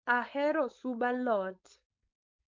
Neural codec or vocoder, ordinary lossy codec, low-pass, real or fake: codec, 16 kHz, 4.8 kbps, FACodec; MP3, 48 kbps; 7.2 kHz; fake